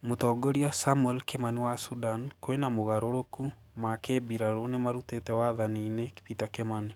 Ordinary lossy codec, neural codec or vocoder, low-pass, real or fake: none; codec, 44.1 kHz, 7.8 kbps, DAC; 19.8 kHz; fake